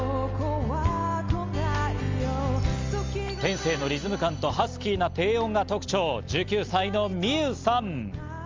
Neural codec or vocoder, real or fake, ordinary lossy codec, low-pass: none; real; Opus, 32 kbps; 7.2 kHz